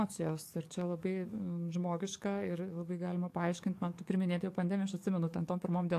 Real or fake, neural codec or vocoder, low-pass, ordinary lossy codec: fake; codec, 44.1 kHz, 7.8 kbps, DAC; 14.4 kHz; AAC, 64 kbps